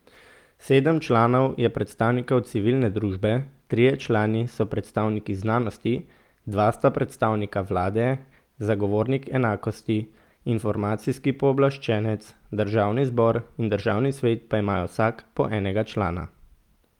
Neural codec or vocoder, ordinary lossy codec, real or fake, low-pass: none; Opus, 32 kbps; real; 19.8 kHz